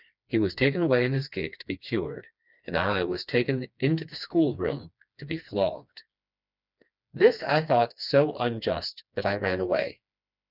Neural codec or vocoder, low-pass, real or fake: codec, 16 kHz, 2 kbps, FreqCodec, smaller model; 5.4 kHz; fake